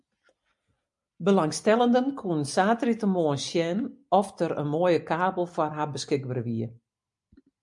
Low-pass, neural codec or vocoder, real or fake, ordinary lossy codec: 10.8 kHz; none; real; MP3, 96 kbps